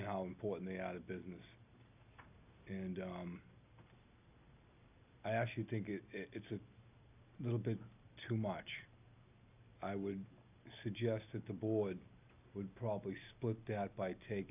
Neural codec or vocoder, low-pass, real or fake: none; 3.6 kHz; real